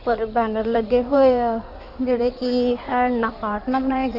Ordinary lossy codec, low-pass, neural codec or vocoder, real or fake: none; 5.4 kHz; codec, 16 kHz in and 24 kHz out, 2.2 kbps, FireRedTTS-2 codec; fake